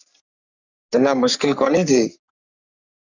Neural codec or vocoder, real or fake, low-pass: codec, 44.1 kHz, 3.4 kbps, Pupu-Codec; fake; 7.2 kHz